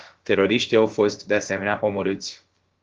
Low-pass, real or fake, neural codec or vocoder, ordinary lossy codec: 7.2 kHz; fake; codec, 16 kHz, about 1 kbps, DyCAST, with the encoder's durations; Opus, 16 kbps